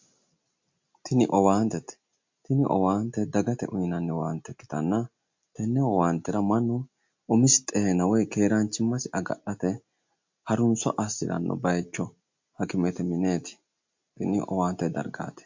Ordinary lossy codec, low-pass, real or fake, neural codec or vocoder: MP3, 48 kbps; 7.2 kHz; real; none